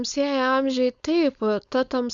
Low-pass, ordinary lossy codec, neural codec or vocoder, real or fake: 7.2 kHz; Opus, 64 kbps; codec, 16 kHz, 4.8 kbps, FACodec; fake